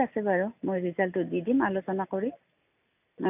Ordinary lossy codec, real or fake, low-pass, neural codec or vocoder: none; real; 3.6 kHz; none